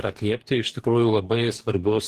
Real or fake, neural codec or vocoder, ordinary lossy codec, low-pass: fake; codec, 44.1 kHz, 2.6 kbps, DAC; Opus, 16 kbps; 14.4 kHz